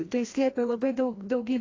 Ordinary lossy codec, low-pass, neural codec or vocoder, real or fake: AAC, 32 kbps; 7.2 kHz; codec, 16 kHz, 1 kbps, FreqCodec, larger model; fake